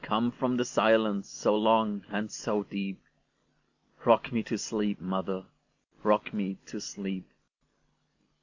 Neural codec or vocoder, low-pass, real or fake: none; 7.2 kHz; real